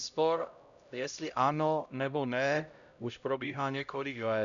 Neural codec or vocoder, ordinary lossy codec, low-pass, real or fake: codec, 16 kHz, 0.5 kbps, X-Codec, HuBERT features, trained on LibriSpeech; MP3, 96 kbps; 7.2 kHz; fake